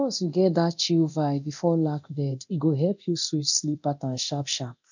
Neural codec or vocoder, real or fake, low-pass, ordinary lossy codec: codec, 24 kHz, 0.9 kbps, DualCodec; fake; 7.2 kHz; none